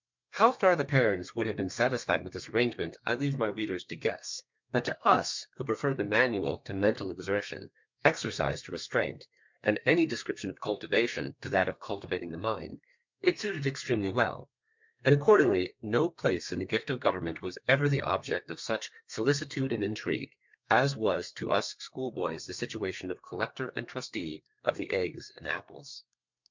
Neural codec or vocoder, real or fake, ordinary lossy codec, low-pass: codec, 32 kHz, 1.9 kbps, SNAC; fake; MP3, 64 kbps; 7.2 kHz